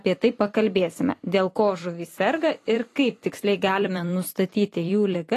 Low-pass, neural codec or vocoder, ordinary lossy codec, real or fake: 14.4 kHz; vocoder, 44.1 kHz, 128 mel bands every 256 samples, BigVGAN v2; AAC, 48 kbps; fake